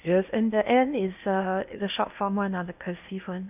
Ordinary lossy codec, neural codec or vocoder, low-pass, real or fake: none; codec, 16 kHz in and 24 kHz out, 0.8 kbps, FocalCodec, streaming, 65536 codes; 3.6 kHz; fake